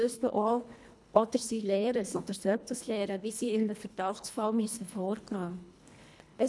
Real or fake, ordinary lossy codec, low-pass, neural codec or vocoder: fake; none; none; codec, 24 kHz, 1.5 kbps, HILCodec